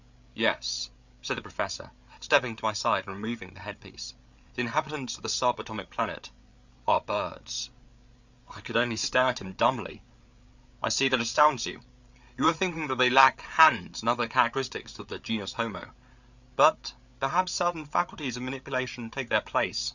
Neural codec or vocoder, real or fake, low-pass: codec, 16 kHz, 8 kbps, FreqCodec, larger model; fake; 7.2 kHz